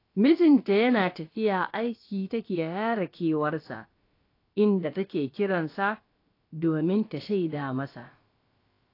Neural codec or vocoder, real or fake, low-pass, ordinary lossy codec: codec, 16 kHz, about 1 kbps, DyCAST, with the encoder's durations; fake; 5.4 kHz; AAC, 32 kbps